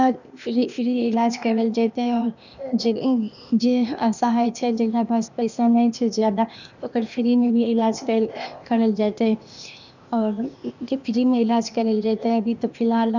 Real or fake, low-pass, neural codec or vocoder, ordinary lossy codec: fake; 7.2 kHz; codec, 16 kHz, 0.8 kbps, ZipCodec; none